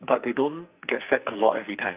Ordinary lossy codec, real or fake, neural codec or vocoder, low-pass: Opus, 24 kbps; fake; codec, 44.1 kHz, 2.6 kbps, SNAC; 3.6 kHz